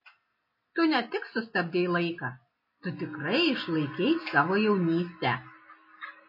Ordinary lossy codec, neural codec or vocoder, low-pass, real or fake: MP3, 24 kbps; none; 5.4 kHz; real